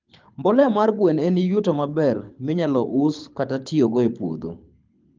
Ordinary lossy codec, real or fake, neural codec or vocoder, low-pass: Opus, 32 kbps; fake; codec, 24 kHz, 6 kbps, HILCodec; 7.2 kHz